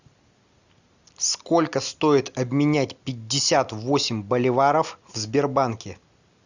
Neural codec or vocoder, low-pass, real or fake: none; 7.2 kHz; real